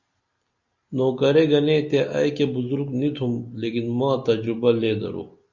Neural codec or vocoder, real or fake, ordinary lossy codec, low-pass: none; real; Opus, 64 kbps; 7.2 kHz